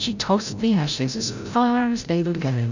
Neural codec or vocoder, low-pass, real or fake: codec, 16 kHz, 0.5 kbps, FreqCodec, larger model; 7.2 kHz; fake